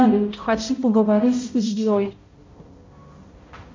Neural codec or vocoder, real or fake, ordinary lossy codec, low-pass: codec, 16 kHz, 0.5 kbps, X-Codec, HuBERT features, trained on balanced general audio; fake; MP3, 64 kbps; 7.2 kHz